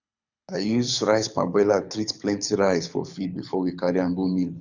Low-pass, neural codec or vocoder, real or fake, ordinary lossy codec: 7.2 kHz; codec, 24 kHz, 6 kbps, HILCodec; fake; none